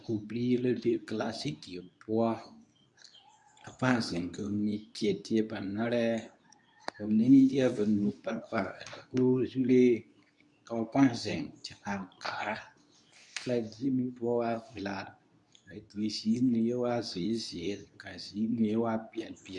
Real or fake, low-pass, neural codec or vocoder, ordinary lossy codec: fake; 10.8 kHz; codec, 24 kHz, 0.9 kbps, WavTokenizer, medium speech release version 2; MP3, 96 kbps